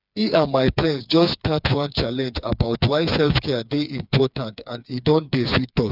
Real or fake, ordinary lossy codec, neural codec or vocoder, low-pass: fake; none; codec, 16 kHz, 4 kbps, FreqCodec, smaller model; 5.4 kHz